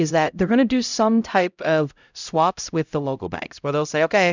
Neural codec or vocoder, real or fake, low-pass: codec, 16 kHz, 0.5 kbps, X-Codec, HuBERT features, trained on LibriSpeech; fake; 7.2 kHz